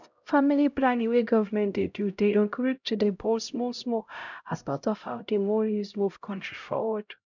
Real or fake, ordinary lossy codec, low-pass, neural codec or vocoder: fake; none; 7.2 kHz; codec, 16 kHz, 0.5 kbps, X-Codec, HuBERT features, trained on LibriSpeech